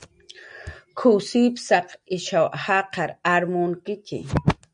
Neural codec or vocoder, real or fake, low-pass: none; real; 9.9 kHz